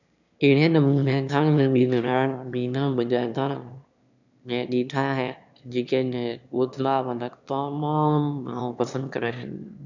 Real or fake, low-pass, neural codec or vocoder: fake; 7.2 kHz; autoencoder, 22.05 kHz, a latent of 192 numbers a frame, VITS, trained on one speaker